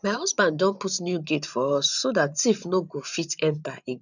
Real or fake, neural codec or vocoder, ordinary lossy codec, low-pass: fake; vocoder, 44.1 kHz, 128 mel bands every 512 samples, BigVGAN v2; none; 7.2 kHz